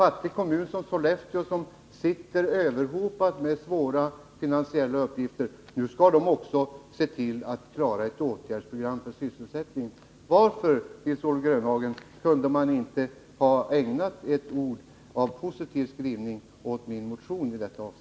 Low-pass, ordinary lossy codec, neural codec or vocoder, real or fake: none; none; none; real